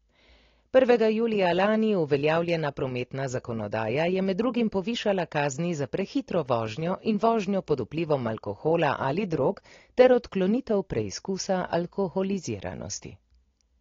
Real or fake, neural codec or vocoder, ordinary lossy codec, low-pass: real; none; AAC, 32 kbps; 7.2 kHz